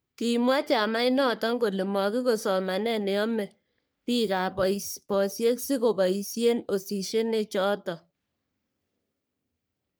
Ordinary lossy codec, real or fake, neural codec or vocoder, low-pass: none; fake; codec, 44.1 kHz, 3.4 kbps, Pupu-Codec; none